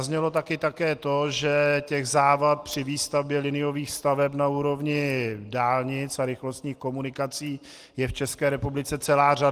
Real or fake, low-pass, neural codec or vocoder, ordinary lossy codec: real; 14.4 kHz; none; Opus, 24 kbps